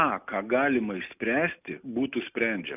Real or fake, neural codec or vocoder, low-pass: real; none; 3.6 kHz